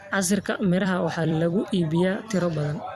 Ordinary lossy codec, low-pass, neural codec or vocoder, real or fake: Opus, 64 kbps; 14.4 kHz; vocoder, 44.1 kHz, 128 mel bands every 256 samples, BigVGAN v2; fake